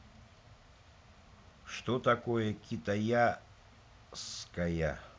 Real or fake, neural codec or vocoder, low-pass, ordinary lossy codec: real; none; none; none